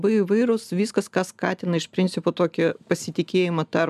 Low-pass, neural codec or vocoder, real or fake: 14.4 kHz; none; real